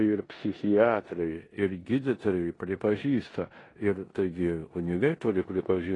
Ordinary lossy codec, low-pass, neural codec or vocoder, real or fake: AAC, 32 kbps; 10.8 kHz; codec, 16 kHz in and 24 kHz out, 0.9 kbps, LongCat-Audio-Codec, four codebook decoder; fake